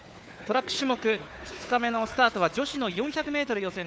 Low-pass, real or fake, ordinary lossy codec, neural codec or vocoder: none; fake; none; codec, 16 kHz, 4 kbps, FunCodec, trained on Chinese and English, 50 frames a second